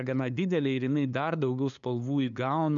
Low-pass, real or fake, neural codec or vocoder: 7.2 kHz; fake; codec, 16 kHz, 2 kbps, FunCodec, trained on LibriTTS, 25 frames a second